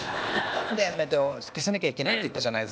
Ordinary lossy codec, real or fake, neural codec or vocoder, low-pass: none; fake; codec, 16 kHz, 0.8 kbps, ZipCodec; none